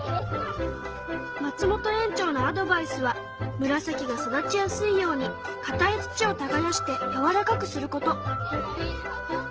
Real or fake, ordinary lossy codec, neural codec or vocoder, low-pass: real; Opus, 16 kbps; none; 7.2 kHz